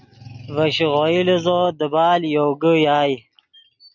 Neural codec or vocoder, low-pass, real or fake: none; 7.2 kHz; real